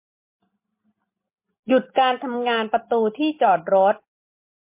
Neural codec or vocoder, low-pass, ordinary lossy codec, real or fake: none; 3.6 kHz; MP3, 24 kbps; real